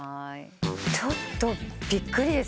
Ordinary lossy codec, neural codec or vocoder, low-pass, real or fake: none; none; none; real